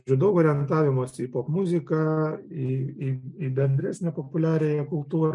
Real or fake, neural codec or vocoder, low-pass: real; none; 10.8 kHz